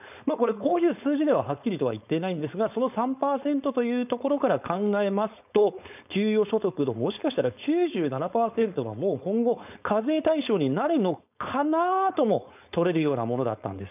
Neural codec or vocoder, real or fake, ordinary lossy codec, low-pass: codec, 16 kHz, 4.8 kbps, FACodec; fake; none; 3.6 kHz